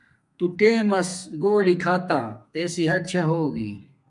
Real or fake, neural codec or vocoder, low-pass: fake; codec, 32 kHz, 1.9 kbps, SNAC; 10.8 kHz